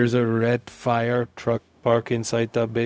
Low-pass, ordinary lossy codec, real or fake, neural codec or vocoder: none; none; fake; codec, 16 kHz, 0.4 kbps, LongCat-Audio-Codec